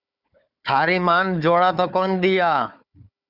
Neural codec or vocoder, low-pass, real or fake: codec, 16 kHz, 4 kbps, FunCodec, trained on Chinese and English, 50 frames a second; 5.4 kHz; fake